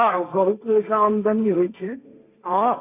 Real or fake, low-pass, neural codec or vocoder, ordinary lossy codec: fake; 3.6 kHz; codec, 16 kHz, 1.1 kbps, Voila-Tokenizer; none